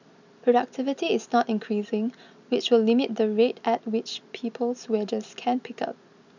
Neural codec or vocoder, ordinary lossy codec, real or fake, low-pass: none; none; real; 7.2 kHz